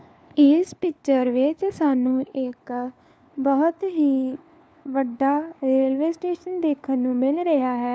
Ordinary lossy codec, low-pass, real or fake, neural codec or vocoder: none; none; fake; codec, 16 kHz, 4 kbps, FunCodec, trained on LibriTTS, 50 frames a second